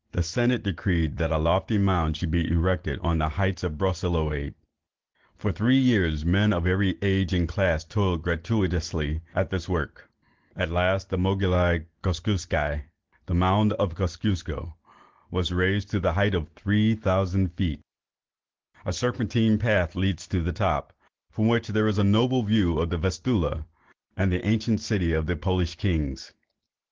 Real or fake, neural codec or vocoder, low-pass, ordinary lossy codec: real; none; 7.2 kHz; Opus, 16 kbps